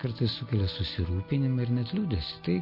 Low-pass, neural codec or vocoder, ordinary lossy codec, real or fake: 5.4 kHz; none; MP3, 32 kbps; real